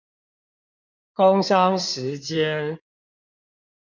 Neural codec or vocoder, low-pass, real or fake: codec, 16 kHz in and 24 kHz out, 2.2 kbps, FireRedTTS-2 codec; 7.2 kHz; fake